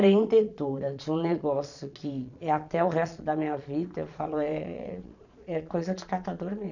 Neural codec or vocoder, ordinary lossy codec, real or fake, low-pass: vocoder, 22.05 kHz, 80 mel bands, Vocos; none; fake; 7.2 kHz